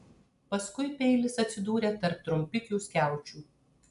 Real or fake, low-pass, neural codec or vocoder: real; 10.8 kHz; none